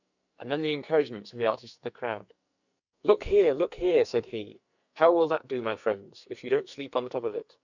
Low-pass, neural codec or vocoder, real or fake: 7.2 kHz; codec, 44.1 kHz, 2.6 kbps, SNAC; fake